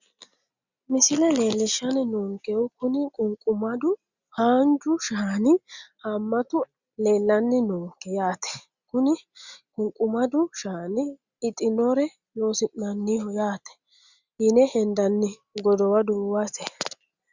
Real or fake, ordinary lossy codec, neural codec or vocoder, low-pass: real; Opus, 64 kbps; none; 7.2 kHz